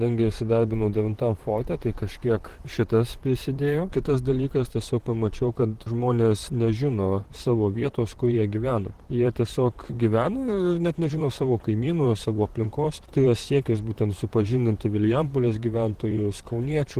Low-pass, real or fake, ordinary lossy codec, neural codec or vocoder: 14.4 kHz; fake; Opus, 16 kbps; vocoder, 44.1 kHz, 128 mel bands, Pupu-Vocoder